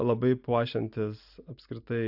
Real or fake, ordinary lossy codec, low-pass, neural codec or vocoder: real; AAC, 48 kbps; 5.4 kHz; none